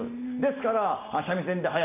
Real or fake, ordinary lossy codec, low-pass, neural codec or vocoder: real; none; 3.6 kHz; none